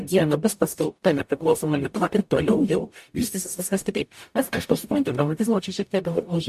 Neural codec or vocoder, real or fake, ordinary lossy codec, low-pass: codec, 44.1 kHz, 0.9 kbps, DAC; fake; MP3, 64 kbps; 14.4 kHz